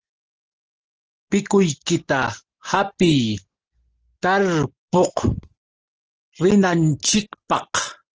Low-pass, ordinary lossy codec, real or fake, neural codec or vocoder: 7.2 kHz; Opus, 16 kbps; real; none